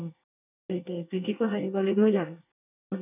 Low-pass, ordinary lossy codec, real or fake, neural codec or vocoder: 3.6 kHz; none; fake; codec, 24 kHz, 1 kbps, SNAC